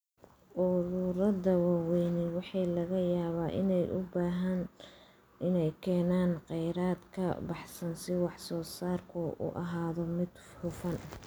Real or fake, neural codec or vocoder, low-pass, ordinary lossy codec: real; none; none; none